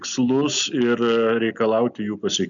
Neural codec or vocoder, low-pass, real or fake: none; 7.2 kHz; real